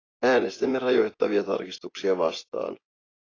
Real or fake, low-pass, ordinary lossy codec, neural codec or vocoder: fake; 7.2 kHz; AAC, 32 kbps; vocoder, 24 kHz, 100 mel bands, Vocos